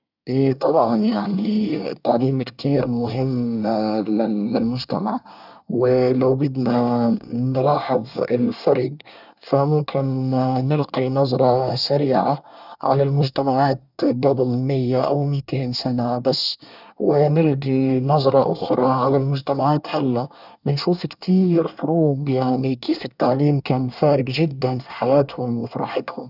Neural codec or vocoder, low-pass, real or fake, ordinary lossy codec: codec, 24 kHz, 1 kbps, SNAC; 5.4 kHz; fake; none